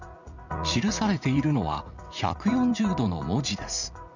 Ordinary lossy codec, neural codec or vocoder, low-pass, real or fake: none; vocoder, 44.1 kHz, 80 mel bands, Vocos; 7.2 kHz; fake